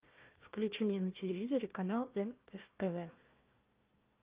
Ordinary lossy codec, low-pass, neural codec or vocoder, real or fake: Opus, 24 kbps; 3.6 kHz; codec, 16 kHz, 1 kbps, FunCodec, trained on Chinese and English, 50 frames a second; fake